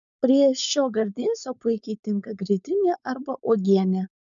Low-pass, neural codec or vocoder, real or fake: 7.2 kHz; codec, 16 kHz, 4.8 kbps, FACodec; fake